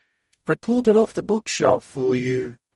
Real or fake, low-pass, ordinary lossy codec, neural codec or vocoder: fake; 19.8 kHz; MP3, 48 kbps; codec, 44.1 kHz, 0.9 kbps, DAC